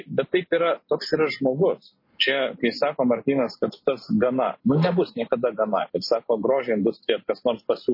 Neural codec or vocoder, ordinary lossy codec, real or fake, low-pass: none; MP3, 24 kbps; real; 5.4 kHz